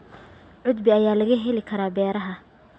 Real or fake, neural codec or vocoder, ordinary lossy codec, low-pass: real; none; none; none